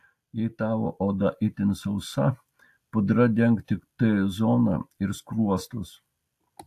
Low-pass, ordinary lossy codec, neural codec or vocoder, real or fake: 14.4 kHz; AAC, 64 kbps; none; real